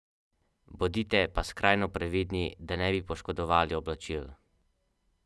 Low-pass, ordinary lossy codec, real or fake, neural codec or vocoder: none; none; real; none